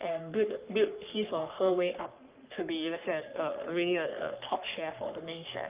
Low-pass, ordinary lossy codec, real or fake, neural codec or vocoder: 3.6 kHz; Opus, 24 kbps; fake; codec, 44.1 kHz, 3.4 kbps, Pupu-Codec